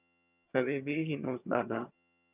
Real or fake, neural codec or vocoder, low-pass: fake; vocoder, 22.05 kHz, 80 mel bands, HiFi-GAN; 3.6 kHz